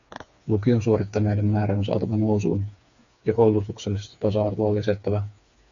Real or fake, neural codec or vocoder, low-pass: fake; codec, 16 kHz, 4 kbps, FreqCodec, smaller model; 7.2 kHz